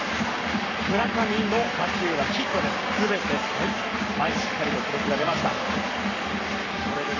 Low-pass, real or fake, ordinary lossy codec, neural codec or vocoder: 7.2 kHz; fake; none; codec, 44.1 kHz, 7.8 kbps, Pupu-Codec